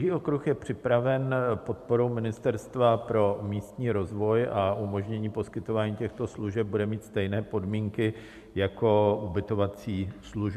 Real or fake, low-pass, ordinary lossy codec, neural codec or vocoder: fake; 14.4 kHz; MP3, 96 kbps; vocoder, 44.1 kHz, 128 mel bands every 512 samples, BigVGAN v2